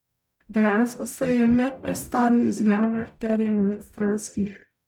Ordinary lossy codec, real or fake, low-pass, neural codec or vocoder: none; fake; 19.8 kHz; codec, 44.1 kHz, 0.9 kbps, DAC